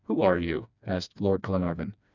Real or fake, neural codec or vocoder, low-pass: fake; codec, 16 kHz, 2 kbps, FreqCodec, smaller model; 7.2 kHz